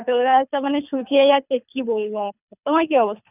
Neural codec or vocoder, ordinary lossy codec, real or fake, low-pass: codec, 24 kHz, 3 kbps, HILCodec; none; fake; 3.6 kHz